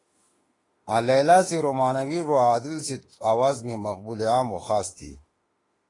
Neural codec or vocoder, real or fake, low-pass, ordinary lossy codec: autoencoder, 48 kHz, 32 numbers a frame, DAC-VAE, trained on Japanese speech; fake; 10.8 kHz; AAC, 32 kbps